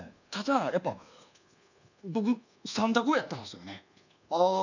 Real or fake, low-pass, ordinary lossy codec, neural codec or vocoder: fake; 7.2 kHz; none; codec, 24 kHz, 1.2 kbps, DualCodec